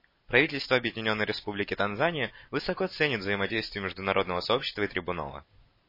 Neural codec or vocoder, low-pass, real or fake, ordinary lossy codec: none; 5.4 kHz; real; MP3, 24 kbps